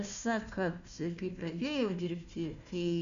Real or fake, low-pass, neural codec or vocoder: fake; 7.2 kHz; codec, 16 kHz, 1 kbps, FunCodec, trained on Chinese and English, 50 frames a second